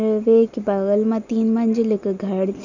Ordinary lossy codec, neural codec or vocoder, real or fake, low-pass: none; none; real; 7.2 kHz